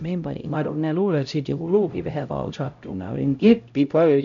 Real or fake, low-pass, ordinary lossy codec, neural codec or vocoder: fake; 7.2 kHz; none; codec, 16 kHz, 0.5 kbps, X-Codec, HuBERT features, trained on LibriSpeech